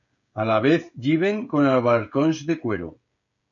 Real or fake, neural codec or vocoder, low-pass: fake; codec, 16 kHz, 16 kbps, FreqCodec, smaller model; 7.2 kHz